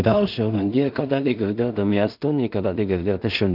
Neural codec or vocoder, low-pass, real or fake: codec, 16 kHz in and 24 kHz out, 0.4 kbps, LongCat-Audio-Codec, two codebook decoder; 5.4 kHz; fake